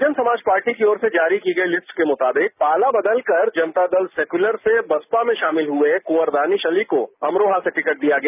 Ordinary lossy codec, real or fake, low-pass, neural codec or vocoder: none; real; 3.6 kHz; none